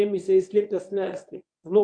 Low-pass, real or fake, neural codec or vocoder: 9.9 kHz; fake; codec, 24 kHz, 0.9 kbps, WavTokenizer, medium speech release version 1